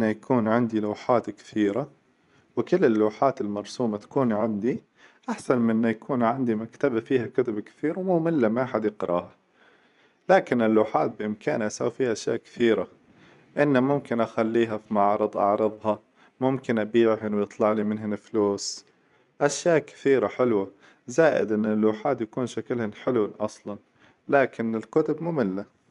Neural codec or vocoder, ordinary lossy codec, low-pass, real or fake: vocoder, 24 kHz, 100 mel bands, Vocos; none; 10.8 kHz; fake